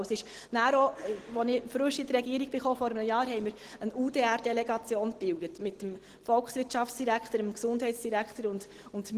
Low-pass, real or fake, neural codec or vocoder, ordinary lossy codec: 14.4 kHz; real; none; Opus, 16 kbps